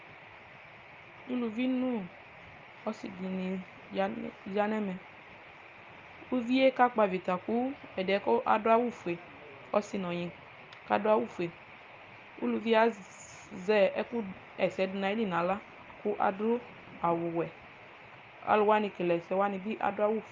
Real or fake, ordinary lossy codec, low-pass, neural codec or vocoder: real; Opus, 24 kbps; 7.2 kHz; none